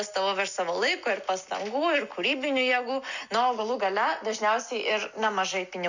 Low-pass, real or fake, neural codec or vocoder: 7.2 kHz; real; none